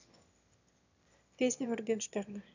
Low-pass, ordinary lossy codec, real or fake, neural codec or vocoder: 7.2 kHz; none; fake; autoencoder, 22.05 kHz, a latent of 192 numbers a frame, VITS, trained on one speaker